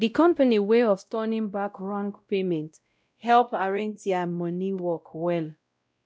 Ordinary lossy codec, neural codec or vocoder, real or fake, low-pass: none; codec, 16 kHz, 0.5 kbps, X-Codec, WavLM features, trained on Multilingual LibriSpeech; fake; none